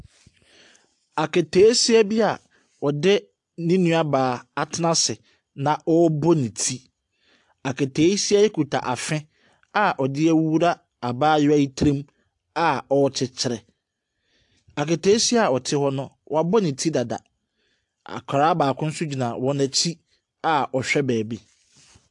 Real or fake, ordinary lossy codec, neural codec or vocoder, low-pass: real; AAC, 64 kbps; none; 10.8 kHz